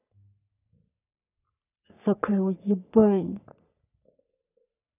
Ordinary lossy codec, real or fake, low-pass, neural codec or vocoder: none; fake; 3.6 kHz; codec, 44.1 kHz, 3.4 kbps, Pupu-Codec